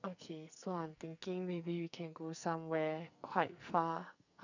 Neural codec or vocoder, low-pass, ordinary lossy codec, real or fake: codec, 44.1 kHz, 2.6 kbps, SNAC; 7.2 kHz; none; fake